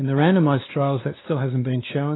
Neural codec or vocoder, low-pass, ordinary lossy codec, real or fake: codec, 16 kHz, 2 kbps, X-Codec, WavLM features, trained on Multilingual LibriSpeech; 7.2 kHz; AAC, 16 kbps; fake